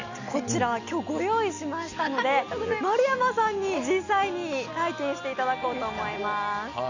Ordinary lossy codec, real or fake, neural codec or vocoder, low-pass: none; real; none; 7.2 kHz